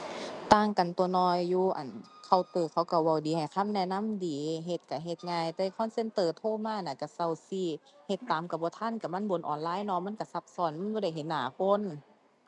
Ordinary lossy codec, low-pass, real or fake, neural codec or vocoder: none; 10.8 kHz; real; none